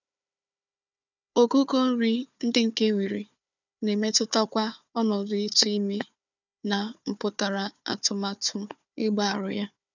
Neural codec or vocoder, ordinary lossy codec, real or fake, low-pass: codec, 16 kHz, 4 kbps, FunCodec, trained on Chinese and English, 50 frames a second; none; fake; 7.2 kHz